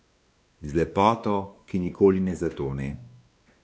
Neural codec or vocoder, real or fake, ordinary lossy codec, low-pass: codec, 16 kHz, 2 kbps, X-Codec, WavLM features, trained on Multilingual LibriSpeech; fake; none; none